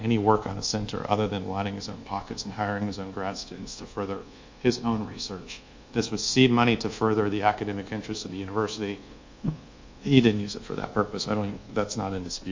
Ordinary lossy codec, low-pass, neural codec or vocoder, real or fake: MP3, 48 kbps; 7.2 kHz; codec, 24 kHz, 1.2 kbps, DualCodec; fake